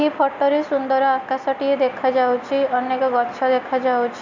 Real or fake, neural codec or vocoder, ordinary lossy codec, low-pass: real; none; Opus, 64 kbps; 7.2 kHz